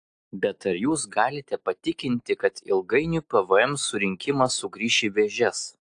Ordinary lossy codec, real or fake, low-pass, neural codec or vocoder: AAC, 64 kbps; real; 9.9 kHz; none